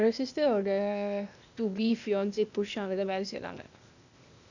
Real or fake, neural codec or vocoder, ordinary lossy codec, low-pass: fake; codec, 16 kHz, 0.8 kbps, ZipCodec; none; 7.2 kHz